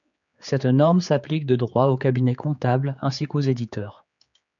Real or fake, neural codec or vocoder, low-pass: fake; codec, 16 kHz, 4 kbps, X-Codec, HuBERT features, trained on general audio; 7.2 kHz